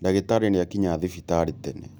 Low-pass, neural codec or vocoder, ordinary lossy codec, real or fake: none; none; none; real